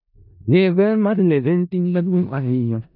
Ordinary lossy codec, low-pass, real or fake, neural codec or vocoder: none; 5.4 kHz; fake; codec, 16 kHz in and 24 kHz out, 0.4 kbps, LongCat-Audio-Codec, four codebook decoder